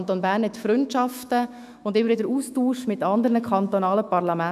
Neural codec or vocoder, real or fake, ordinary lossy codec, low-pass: autoencoder, 48 kHz, 128 numbers a frame, DAC-VAE, trained on Japanese speech; fake; none; 14.4 kHz